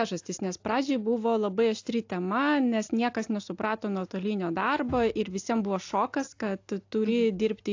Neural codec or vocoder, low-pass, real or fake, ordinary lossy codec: none; 7.2 kHz; real; AAC, 48 kbps